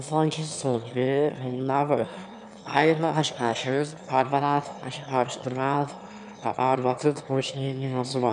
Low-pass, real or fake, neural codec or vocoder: 9.9 kHz; fake; autoencoder, 22.05 kHz, a latent of 192 numbers a frame, VITS, trained on one speaker